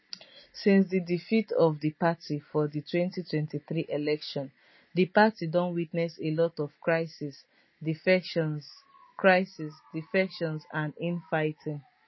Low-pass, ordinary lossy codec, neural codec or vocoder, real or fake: 7.2 kHz; MP3, 24 kbps; none; real